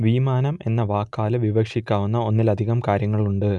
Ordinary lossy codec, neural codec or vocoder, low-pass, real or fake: none; none; 10.8 kHz; real